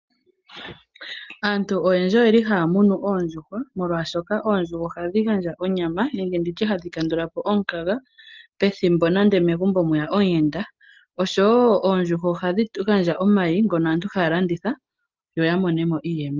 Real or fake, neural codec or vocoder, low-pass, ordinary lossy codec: real; none; 7.2 kHz; Opus, 32 kbps